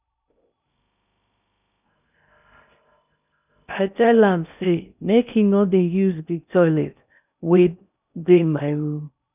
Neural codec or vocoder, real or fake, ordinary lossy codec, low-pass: codec, 16 kHz in and 24 kHz out, 0.6 kbps, FocalCodec, streaming, 2048 codes; fake; none; 3.6 kHz